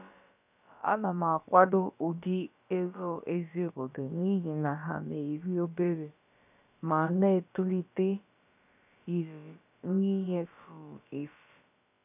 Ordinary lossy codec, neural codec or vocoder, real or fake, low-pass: none; codec, 16 kHz, about 1 kbps, DyCAST, with the encoder's durations; fake; 3.6 kHz